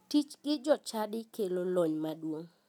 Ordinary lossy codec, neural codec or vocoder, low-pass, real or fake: none; none; 19.8 kHz; real